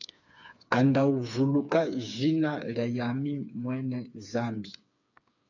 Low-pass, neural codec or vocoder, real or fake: 7.2 kHz; codec, 16 kHz, 4 kbps, FreqCodec, smaller model; fake